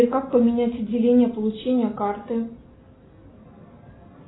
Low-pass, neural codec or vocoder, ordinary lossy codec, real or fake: 7.2 kHz; none; AAC, 16 kbps; real